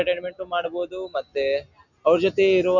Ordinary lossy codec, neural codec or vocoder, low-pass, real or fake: none; none; 7.2 kHz; real